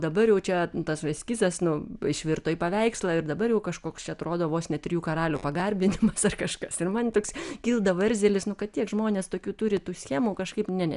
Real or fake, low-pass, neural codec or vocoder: real; 10.8 kHz; none